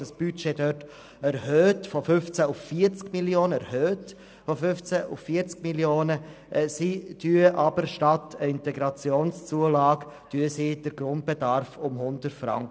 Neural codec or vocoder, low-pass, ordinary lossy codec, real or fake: none; none; none; real